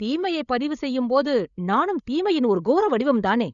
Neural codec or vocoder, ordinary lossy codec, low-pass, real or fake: codec, 16 kHz, 8 kbps, FreqCodec, larger model; none; 7.2 kHz; fake